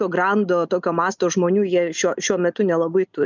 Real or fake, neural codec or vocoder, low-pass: real; none; 7.2 kHz